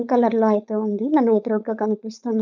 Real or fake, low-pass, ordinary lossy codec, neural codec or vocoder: fake; 7.2 kHz; none; codec, 16 kHz, 4.8 kbps, FACodec